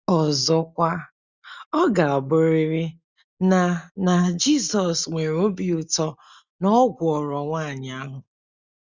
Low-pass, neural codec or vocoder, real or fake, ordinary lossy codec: 7.2 kHz; none; real; Opus, 64 kbps